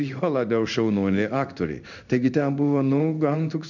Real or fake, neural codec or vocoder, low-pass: fake; codec, 16 kHz in and 24 kHz out, 1 kbps, XY-Tokenizer; 7.2 kHz